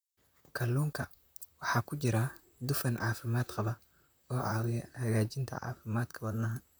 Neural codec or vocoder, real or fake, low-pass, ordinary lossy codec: vocoder, 44.1 kHz, 128 mel bands, Pupu-Vocoder; fake; none; none